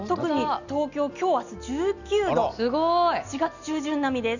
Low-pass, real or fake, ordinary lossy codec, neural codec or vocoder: 7.2 kHz; real; none; none